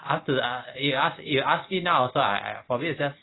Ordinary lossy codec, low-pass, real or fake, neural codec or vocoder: AAC, 16 kbps; 7.2 kHz; real; none